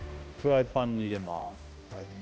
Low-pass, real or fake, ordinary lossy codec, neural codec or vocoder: none; fake; none; codec, 16 kHz, 1 kbps, X-Codec, HuBERT features, trained on balanced general audio